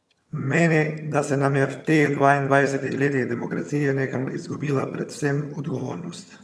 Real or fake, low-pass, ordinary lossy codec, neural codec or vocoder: fake; none; none; vocoder, 22.05 kHz, 80 mel bands, HiFi-GAN